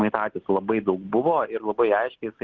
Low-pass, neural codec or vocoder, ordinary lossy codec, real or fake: 7.2 kHz; none; Opus, 16 kbps; real